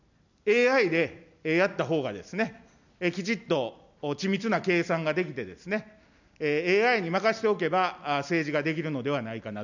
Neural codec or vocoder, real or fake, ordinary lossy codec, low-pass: none; real; none; 7.2 kHz